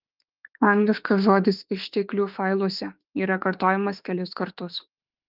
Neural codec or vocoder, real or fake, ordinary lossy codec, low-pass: autoencoder, 48 kHz, 32 numbers a frame, DAC-VAE, trained on Japanese speech; fake; Opus, 32 kbps; 5.4 kHz